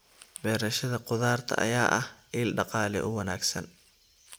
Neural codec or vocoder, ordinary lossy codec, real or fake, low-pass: none; none; real; none